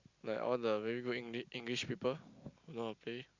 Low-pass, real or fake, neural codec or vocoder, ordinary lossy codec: 7.2 kHz; real; none; Opus, 64 kbps